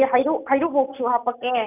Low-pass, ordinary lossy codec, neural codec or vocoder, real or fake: 3.6 kHz; none; none; real